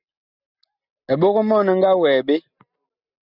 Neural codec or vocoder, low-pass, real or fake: none; 5.4 kHz; real